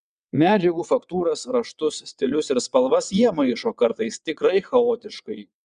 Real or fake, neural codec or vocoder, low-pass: fake; vocoder, 22.05 kHz, 80 mel bands, WaveNeXt; 9.9 kHz